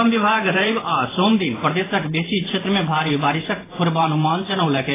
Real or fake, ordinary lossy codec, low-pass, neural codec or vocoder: fake; AAC, 16 kbps; 3.6 kHz; vocoder, 44.1 kHz, 128 mel bands every 512 samples, BigVGAN v2